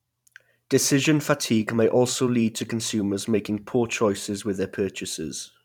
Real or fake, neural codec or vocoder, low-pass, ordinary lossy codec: fake; vocoder, 44.1 kHz, 128 mel bands every 512 samples, BigVGAN v2; 19.8 kHz; none